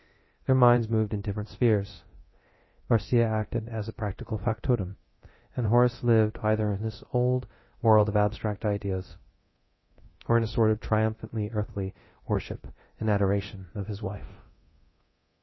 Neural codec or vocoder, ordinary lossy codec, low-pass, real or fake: codec, 24 kHz, 0.9 kbps, DualCodec; MP3, 24 kbps; 7.2 kHz; fake